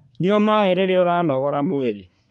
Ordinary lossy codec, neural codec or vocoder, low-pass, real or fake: none; codec, 24 kHz, 1 kbps, SNAC; 10.8 kHz; fake